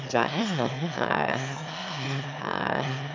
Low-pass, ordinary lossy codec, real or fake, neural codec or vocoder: 7.2 kHz; MP3, 64 kbps; fake; autoencoder, 22.05 kHz, a latent of 192 numbers a frame, VITS, trained on one speaker